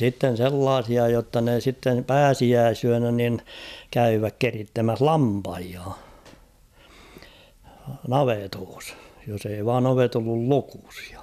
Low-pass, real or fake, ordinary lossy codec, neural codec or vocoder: 14.4 kHz; real; none; none